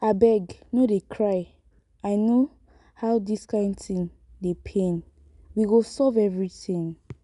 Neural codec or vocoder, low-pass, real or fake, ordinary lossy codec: none; 10.8 kHz; real; none